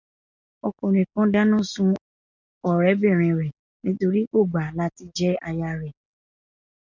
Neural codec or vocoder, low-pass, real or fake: none; 7.2 kHz; real